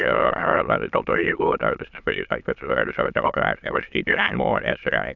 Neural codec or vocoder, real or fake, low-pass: autoencoder, 22.05 kHz, a latent of 192 numbers a frame, VITS, trained on many speakers; fake; 7.2 kHz